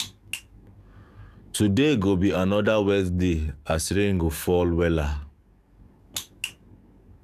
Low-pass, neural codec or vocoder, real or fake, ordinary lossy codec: 14.4 kHz; codec, 44.1 kHz, 7.8 kbps, DAC; fake; none